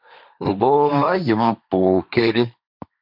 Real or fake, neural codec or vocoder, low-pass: fake; codec, 16 kHz, 1.1 kbps, Voila-Tokenizer; 5.4 kHz